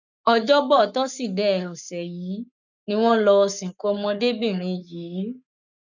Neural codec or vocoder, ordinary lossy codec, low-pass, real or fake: codec, 44.1 kHz, 7.8 kbps, Pupu-Codec; none; 7.2 kHz; fake